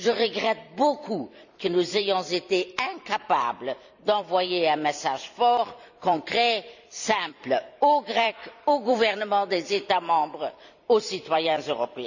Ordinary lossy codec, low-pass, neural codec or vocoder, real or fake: none; 7.2 kHz; vocoder, 44.1 kHz, 128 mel bands every 256 samples, BigVGAN v2; fake